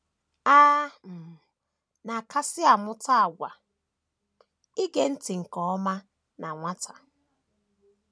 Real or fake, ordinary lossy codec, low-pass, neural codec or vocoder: real; none; none; none